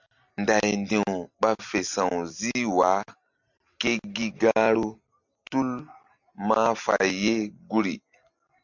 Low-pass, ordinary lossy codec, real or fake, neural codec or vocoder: 7.2 kHz; MP3, 64 kbps; real; none